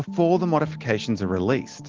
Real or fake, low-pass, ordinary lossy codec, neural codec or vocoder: real; 7.2 kHz; Opus, 16 kbps; none